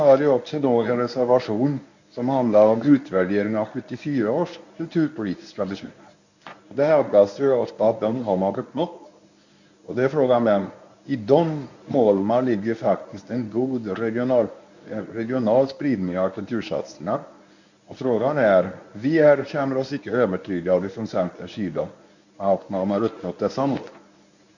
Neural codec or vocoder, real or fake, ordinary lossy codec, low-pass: codec, 24 kHz, 0.9 kbps, WavTokenizer, medium speech release version 1; fake; none; 7.2 kHz